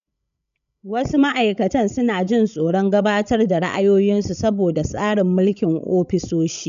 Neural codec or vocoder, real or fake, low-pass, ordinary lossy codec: codec, 16 kHz, 16 kbps, FreqCodec, larger model; fake; 7.2 kHz; AAC, 96 kbps